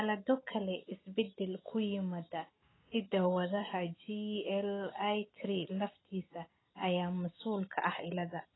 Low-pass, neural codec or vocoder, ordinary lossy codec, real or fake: 7.2 kHz; none; AAC, 16 kbps; real